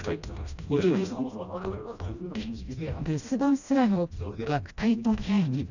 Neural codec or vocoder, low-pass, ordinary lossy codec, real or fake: codec, 16 kHz, 1 kbps, FreqCodec, smaller model; 7.2 kHz; none; fake